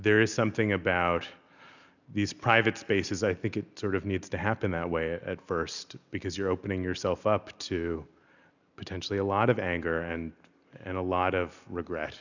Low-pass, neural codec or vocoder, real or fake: 7.2 kHz; none; real